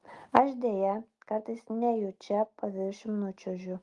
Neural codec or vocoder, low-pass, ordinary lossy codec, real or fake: none; 10.8 kHz; Opus, 24 kbps; real